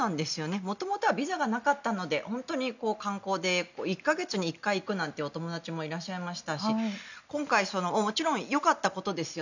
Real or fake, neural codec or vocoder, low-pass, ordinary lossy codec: real; none; 7.2 kHz; none